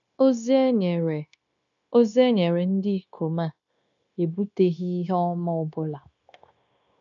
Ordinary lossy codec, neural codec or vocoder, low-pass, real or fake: none; codec, 16 kHz, 0.9 kbps, LongCat-Audio-Codec; 7.2 kHz; fake